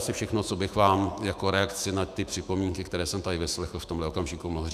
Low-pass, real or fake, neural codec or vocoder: 14.4 kHz; fake; autoencoder, 48 kHz, 128 numbers a frame, DAC-VAE, trained on Japanese speech